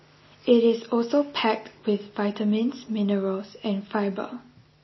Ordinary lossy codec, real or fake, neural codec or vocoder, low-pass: MP3, 24 kbps; real; none; 7.2 kHz